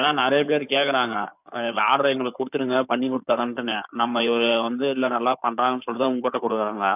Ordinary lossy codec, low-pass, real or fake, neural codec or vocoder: none; 3.6 kHz; fake; codec, 16 kHz in and 24 kHz out, 2.2 kbps, FireRedTTS-2 codec